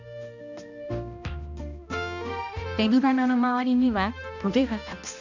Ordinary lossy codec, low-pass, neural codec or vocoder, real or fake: none; 7.2 kHz; codec, 16 kHz, 1 kbps, X-Codec, HuBERT features, trained on balanced general audio; fake